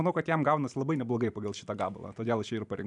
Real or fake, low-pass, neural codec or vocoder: fake; 10.8 kHz; vocoder, 44.1 kHz, 128 mel bands every 512 samples, BigVGAN v2